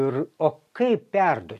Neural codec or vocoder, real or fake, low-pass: none; real; 14.4 kHz